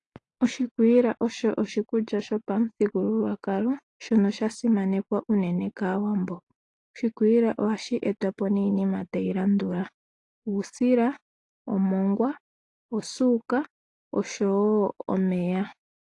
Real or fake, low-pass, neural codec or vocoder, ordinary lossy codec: real; 10.8 kHz; none; AAC, 48 kbps